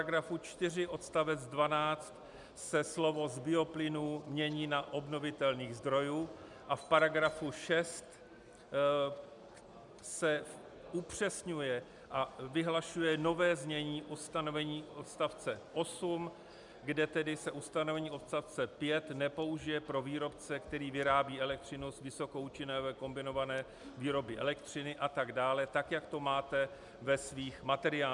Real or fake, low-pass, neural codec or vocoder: real; 10.8 kHz; none